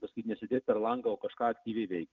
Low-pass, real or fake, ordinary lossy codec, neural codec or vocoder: 7.2 kHz; real; Opus, 32 kbps; none